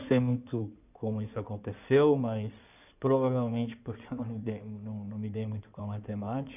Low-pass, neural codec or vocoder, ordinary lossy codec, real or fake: 3.6 kHz; codec, 16 kHz in and 24 kHz out, 2.2 kbps, FireRedTTS-2 codec; none; fake